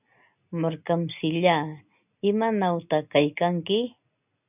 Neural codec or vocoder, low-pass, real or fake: none; 3.6 kHz; real